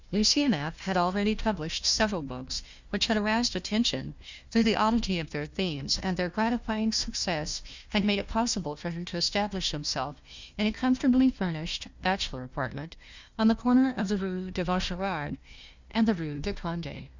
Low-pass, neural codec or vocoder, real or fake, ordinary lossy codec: 7.2 kHz; codec, 16 kHz, 1 kbps, FunCodec, trained on Chinese and English, 50 frames a second; fake; Opus, 64 kbps